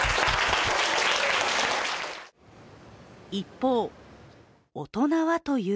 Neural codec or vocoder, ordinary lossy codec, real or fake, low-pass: none; none; real; none